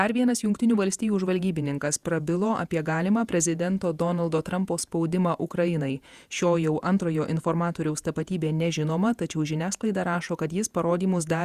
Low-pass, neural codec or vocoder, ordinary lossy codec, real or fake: 14.4 kHz; vocoder, 48 kHz, 128 mel bands, Vocos; Opus, 64 kbps; fake